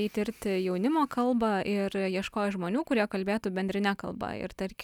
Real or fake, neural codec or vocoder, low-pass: real; none; 19.8 kHz